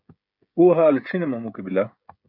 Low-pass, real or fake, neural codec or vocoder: 5.4 kHz; fake; codec, 16 kHz, 16 kbps, FreqCodec, smaller model